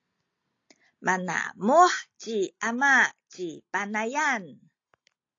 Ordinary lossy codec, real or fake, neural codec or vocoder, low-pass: AAC, 48 kbps; real; none; 7.2 kHz